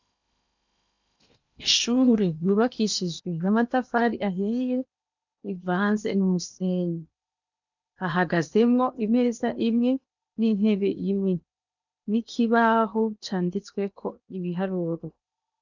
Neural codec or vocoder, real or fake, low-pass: codec, 16 kHz in and 24 kHz out, 0.8 kbps, FocalCodec, streaming, 65536 codes; fake; 7.2 kHz